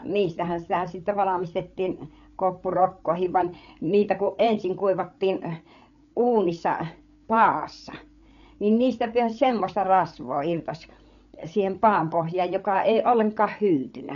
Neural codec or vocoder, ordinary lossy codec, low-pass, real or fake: codec, 16 kHz, 8 kbps, FreqCodec, larger model; none; 7.2 kHz; fake